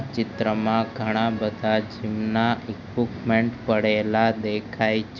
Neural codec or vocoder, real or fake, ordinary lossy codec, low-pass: none; real; none; 7.2 kHz